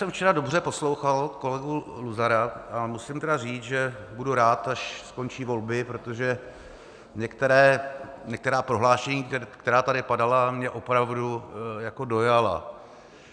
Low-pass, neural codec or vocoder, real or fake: 9.9 kHz; none; real